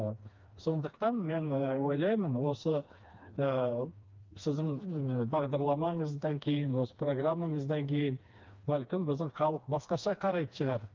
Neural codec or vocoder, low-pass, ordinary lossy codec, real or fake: codec, 16 kHz, 2 kbps, FreqCodec, smaller model; 7.2 kHz; Opus, 32 kbps; fake